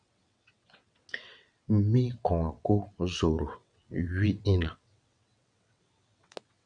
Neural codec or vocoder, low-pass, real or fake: vocoder, 22.05 kHz, 80 mel bands, WaveNeXt; 9.9 kHz; fake